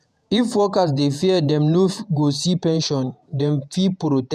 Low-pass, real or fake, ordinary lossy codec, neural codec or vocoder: 14.4 kHz; fake; none; vocoder, 48 kHz, 128 mel bands, Vocos